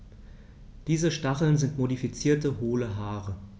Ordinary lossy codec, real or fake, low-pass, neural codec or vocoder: none; real; none; none